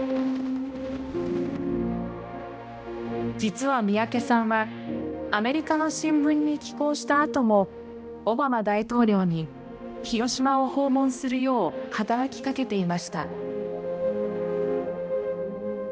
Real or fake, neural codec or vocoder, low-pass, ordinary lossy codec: fake; codec, 16 kHz, 1 kbps, X-Codec, HuBERT features, trained on balanced general audio; none; none